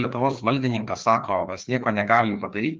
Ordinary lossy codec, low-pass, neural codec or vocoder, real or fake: Opus, 24 kbps; 7.2 kHz; codec, 16 kHz, 2 kbps, FreqCodec, larger model; fake